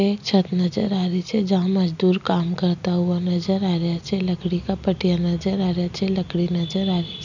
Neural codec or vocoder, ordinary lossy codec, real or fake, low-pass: none; none; real; 7.2 kHz